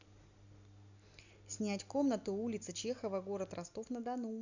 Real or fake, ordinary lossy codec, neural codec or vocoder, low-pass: real; none; none; 7.2 kHz